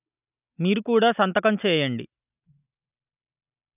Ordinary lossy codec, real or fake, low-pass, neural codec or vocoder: none; real; 3.6 kHz; none